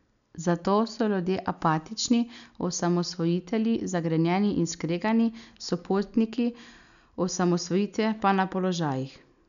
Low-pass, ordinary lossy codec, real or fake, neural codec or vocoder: 7.2 kHz; none; real; none